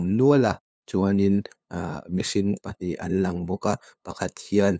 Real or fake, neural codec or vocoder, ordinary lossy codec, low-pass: fake; codec, 16 kHz, 2 kbps, FunCodec, trained on LibriTTS, 25 frames a second; none; none